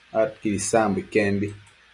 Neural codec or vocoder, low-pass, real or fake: none; 10.8 kHz; real